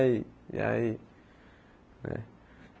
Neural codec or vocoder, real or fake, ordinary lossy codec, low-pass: none; real; none; none